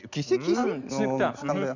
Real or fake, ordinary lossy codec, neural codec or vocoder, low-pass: real; none; none; 7.2 kHz